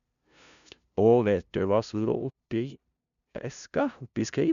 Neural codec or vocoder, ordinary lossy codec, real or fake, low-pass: codec, 16 kHz, 0.5 kbps, FunCodec, trained on LibriTTS, 25 frames a second; none; fake; 7.2 kHz